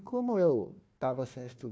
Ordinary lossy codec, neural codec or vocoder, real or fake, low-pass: none; codec, 16 kHz, 1 kbps, FunCodec, trained on Chinese and English, 50 frames a second; fake; none